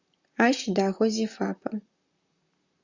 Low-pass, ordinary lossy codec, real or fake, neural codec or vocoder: 7.2 kHz; Opus, 64 kbps; fake; vocoder, 44.1 kHz, 128 mel bands, Pupu-Vocoder